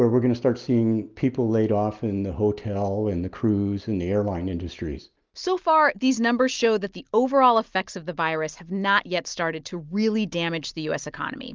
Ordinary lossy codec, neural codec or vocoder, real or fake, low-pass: Opus, 32 kbps; none; real; 7.2 kHz